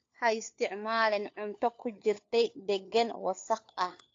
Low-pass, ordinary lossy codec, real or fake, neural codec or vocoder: 7.2 kHz; AAC, 48 kbps; fake; codec, 16 kHz, 4 kbps, FunCodec, trained on LibriTTS, 50 frames a second